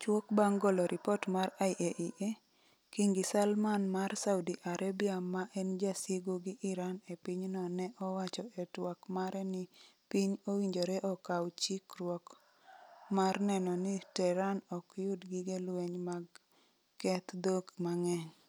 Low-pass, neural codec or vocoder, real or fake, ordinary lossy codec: none; none; real; none